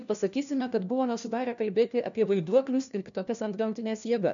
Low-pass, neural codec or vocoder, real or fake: 7.2 kHz; codec, 16 kHz, 1 kbps, FunCodec, trained on LibriTTS, 50 frames a second; fake